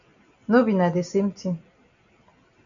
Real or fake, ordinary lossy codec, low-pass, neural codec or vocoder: real; MP3, 96 kbps; 7.2 kHz; none